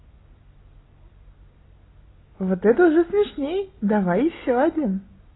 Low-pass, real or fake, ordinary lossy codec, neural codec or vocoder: 7.2 kHz; real; AAC, 16 kbps; none